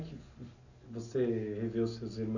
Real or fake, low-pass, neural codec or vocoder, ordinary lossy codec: real; 7.2 kHz; none; none